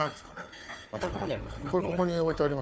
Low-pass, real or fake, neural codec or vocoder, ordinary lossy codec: none; fake; codec, 16 kHz, 4 kbps, FunCodec, trained on Chinese and English, 50 frames a second; none